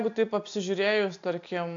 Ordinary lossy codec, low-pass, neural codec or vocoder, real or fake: AAC, 48 kbps; 7.2 kHz; none; real